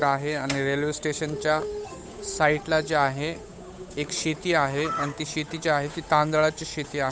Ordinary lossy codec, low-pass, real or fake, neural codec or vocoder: none; none; fake; codec, 16 kHz, 8 kbps, FunCodec, trained on Chinese and English, 25 frames a second